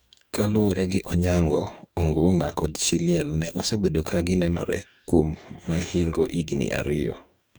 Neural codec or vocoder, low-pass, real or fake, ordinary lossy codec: codec, 44.1 kHz, 2.6 kbps, DAC; none; fake; none